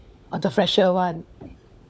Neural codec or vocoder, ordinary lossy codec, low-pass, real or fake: codec, 16 kHz, 16 kbps, FunCodec, trained on LibriTTS, 50 frames a second; none; none; fake